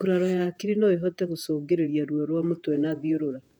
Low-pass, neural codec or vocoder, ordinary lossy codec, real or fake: 19.8 kHz; vocoder, 48 kHz, 128 mel bands, Vocos; none; fake